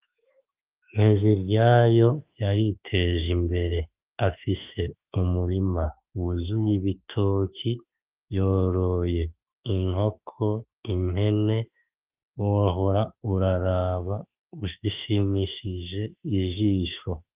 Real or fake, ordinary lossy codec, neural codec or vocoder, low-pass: fake; Opus, 24 kbps; autoencoder, 48 kHz, 32 numbers a frame, DAC-VAE, trained on Japanese speech; 3.6 kHz